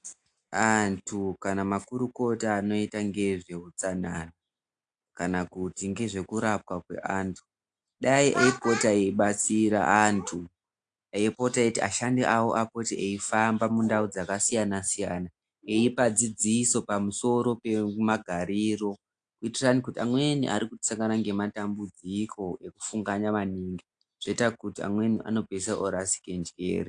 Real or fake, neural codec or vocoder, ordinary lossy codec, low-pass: real; none; AAC, 64 kbps; 9.9 kHz